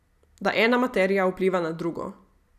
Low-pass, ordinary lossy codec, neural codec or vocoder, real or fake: 14.4 kHz; none; none; real